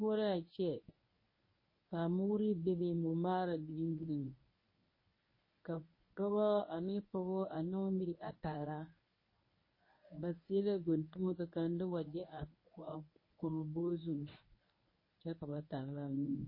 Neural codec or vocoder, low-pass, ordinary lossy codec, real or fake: codec, 24 kHz, 0.9 kbps, WavTokenizer, medium speech release version 1; 5.4 kHz; MP3, 24 kbps; fake